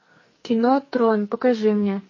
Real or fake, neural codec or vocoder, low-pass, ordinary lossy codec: fake; codec, 44.1 kHz, 2.6 kbps, SNAC; 7.2 kHz; MP3, 32 kbps